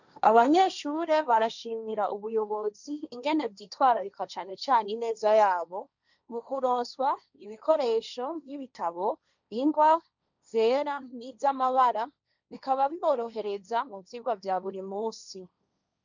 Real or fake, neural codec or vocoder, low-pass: fake; codec, 16 kHz, 1.1 kbps, Voila-Tokenizer; 7.2 kHz